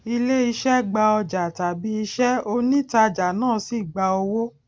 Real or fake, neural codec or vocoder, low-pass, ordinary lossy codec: real; none; none; none